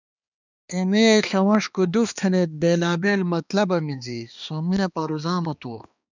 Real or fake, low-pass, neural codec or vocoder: fake; 7.2 kHz; codec, 16 kHz, 2 kbps, X-Codec, HuBERT features, trained on balanced general audio